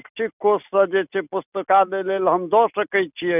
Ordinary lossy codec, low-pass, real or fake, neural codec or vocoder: none; 3.6 kHz; real; none